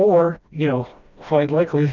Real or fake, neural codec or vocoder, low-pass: fake; codec, 16 kHz, 1 kbps, FreqCodec, smaller model; 7.2 kHz